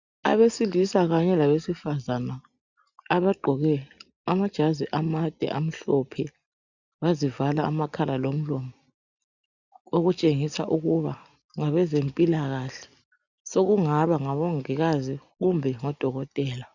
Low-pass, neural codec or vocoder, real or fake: 7.2 kHz; none; real